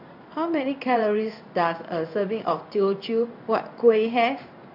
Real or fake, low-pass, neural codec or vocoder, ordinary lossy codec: fake; 5.4 kHz; codec, 16 kHz in and 24 kHz out, 1 kbps, XY-Tokenizer; AAC, 48 kbps